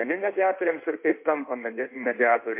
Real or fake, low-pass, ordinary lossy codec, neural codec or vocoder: fake; 3.6 kHz; MP3, 24 kbps; codec, 16 kHz in and 24 kHz out, 1.1 kbps, FireRedTTS-2 codec